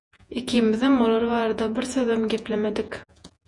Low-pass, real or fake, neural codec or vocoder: 10.8 kHz; fake; vocoder, 48 kHz, 128 mel bands, Vocos